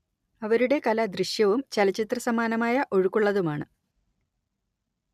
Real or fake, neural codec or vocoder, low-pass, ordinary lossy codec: real; none; 14.4 kHz; none